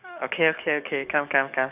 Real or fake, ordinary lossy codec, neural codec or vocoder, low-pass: fake; none; codec, 44.1 kHz, 7.8 kbps, DAC; 3.6 kHz